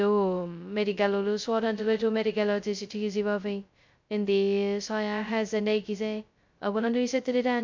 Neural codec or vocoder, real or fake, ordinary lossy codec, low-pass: codec, 16 kHz, 0.2 kbps, FocalCodec; fake; MP3, 64 kbps; 7.2 kHz